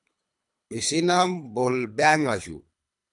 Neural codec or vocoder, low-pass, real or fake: codec, 24 kHz, 3 kbps, HILCodec; 10.8 kHz; fake